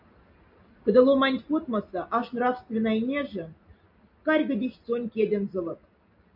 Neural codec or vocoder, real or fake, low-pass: none; real; 5.4 kHz